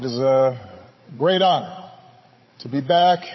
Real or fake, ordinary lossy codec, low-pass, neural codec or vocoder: fake; MP3, 24 kbps; 7.2 kHz; codec, 16 kHz, 16 kbps, FreqCodec, larger model